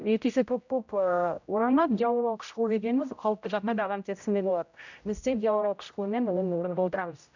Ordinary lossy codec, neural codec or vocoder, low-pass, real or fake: none; codec, 16 kHz, 0.5 kbps, X-Codec, HuBERT features, trained on general audio; 7.2 kHz; fake